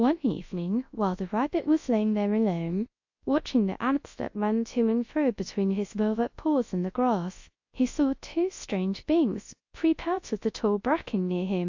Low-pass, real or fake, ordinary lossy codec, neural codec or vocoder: 7.2 kHz; fake; AAC, 48 kbps; codec, 24 kHz, 0.9 kbps, WavTokenizer, large speech release